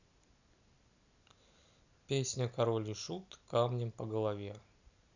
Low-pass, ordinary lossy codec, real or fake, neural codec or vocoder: 7.2 kHz; none; real; none